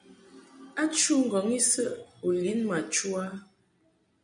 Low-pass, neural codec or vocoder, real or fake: 9.9 kHz; none; real